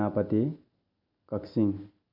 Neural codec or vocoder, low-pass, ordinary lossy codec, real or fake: none; 5.4 kHz; none; real